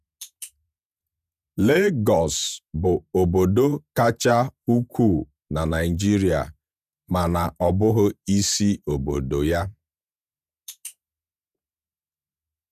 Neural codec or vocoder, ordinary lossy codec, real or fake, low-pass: vocoder, 48 kHz, 128 mel bands, Vocos; none; fake; 14.4 kHz